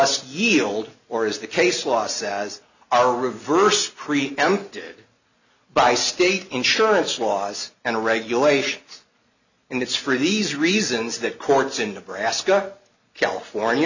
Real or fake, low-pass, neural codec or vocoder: real; 7.2 kHz; none